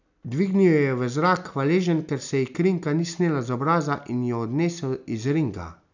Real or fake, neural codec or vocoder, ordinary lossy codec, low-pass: real; none; none; 7.2 kHz